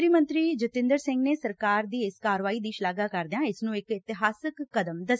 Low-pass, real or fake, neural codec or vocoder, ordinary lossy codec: none; real; none; none